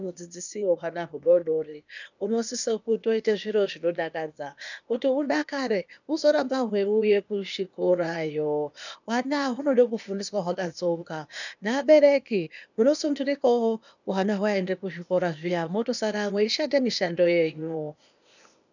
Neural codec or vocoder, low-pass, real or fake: codec, 16 kHz, 0.8 kbps, ZipCodec; 7.2 kHz; fake